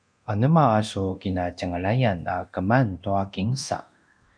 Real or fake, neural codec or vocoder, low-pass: fake; codec, 24 kHz, 0.9 kbps, DualCodec; 9.9 kHz